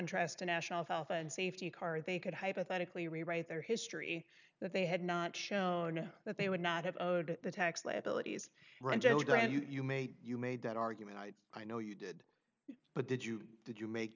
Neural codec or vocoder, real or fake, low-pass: none; real; 7.2 kHz